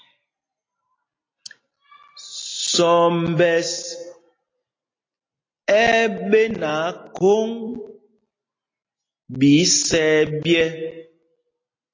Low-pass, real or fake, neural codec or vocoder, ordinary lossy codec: 7.2 kHz; real; none; AAC, 32 kbps